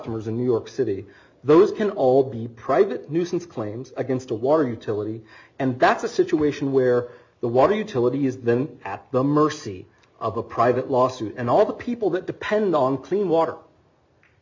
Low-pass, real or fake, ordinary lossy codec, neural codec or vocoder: 7.2 kHz; real; MP3, 64 kbps; none